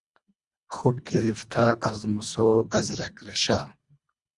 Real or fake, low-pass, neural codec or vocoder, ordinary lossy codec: fake; 10.8 kHz; codec, 24 kHz, 1.5 kbps, HILCodec; Opus, 64 kbps